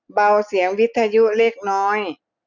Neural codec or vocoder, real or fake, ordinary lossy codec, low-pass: none; real; none; 7.2 kHz